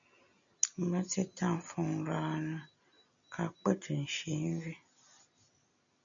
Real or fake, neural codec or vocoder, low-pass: real; none; 7.2 kHz